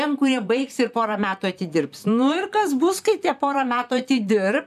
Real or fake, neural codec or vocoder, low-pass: fake; vocoder, 44.1 kHz, 128 mel bands, Pupu-Vocoder; 14.4 kHz